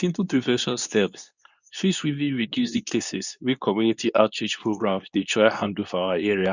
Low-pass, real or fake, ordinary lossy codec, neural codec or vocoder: 7.2 kHz; fake; none; codec, 24 kHz, 0.9 kbps, WavTokenizer, medium speech release version 2